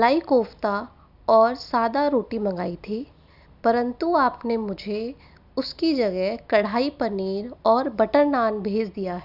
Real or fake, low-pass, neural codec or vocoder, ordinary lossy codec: real; 5.4 kHz; none; none